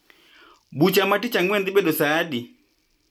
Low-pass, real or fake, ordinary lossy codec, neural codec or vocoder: 19.8 kHz; real; MP3, 96 kbps; none